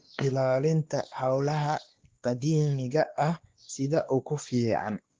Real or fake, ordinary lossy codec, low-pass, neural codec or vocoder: fake; Opus, 16 kbps; 7.2 kHz; codec, 16 kHz, 2 kbps, X-Codec, WavLM features, trained on Multilingual LibriSpeech